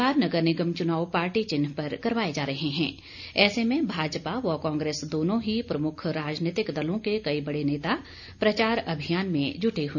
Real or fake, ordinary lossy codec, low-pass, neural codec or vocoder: real; none; 7.2 kHz; none